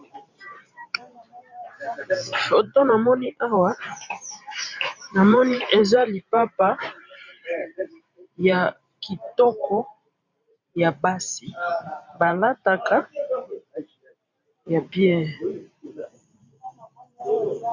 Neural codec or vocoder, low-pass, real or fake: vocoder, 44.1 kHz, 128 mel bands every 512 samples, BigVGAN v2; 7.2 kHz; fake